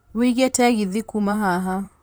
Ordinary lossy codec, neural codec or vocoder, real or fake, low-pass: none; vocoder, 44.1 kHz, 128 mel bands, Pupu-Vocoder; fake; none